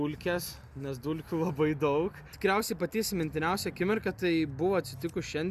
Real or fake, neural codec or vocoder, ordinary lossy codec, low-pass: real; none; Opus, 64 kbps; 14.4 kHz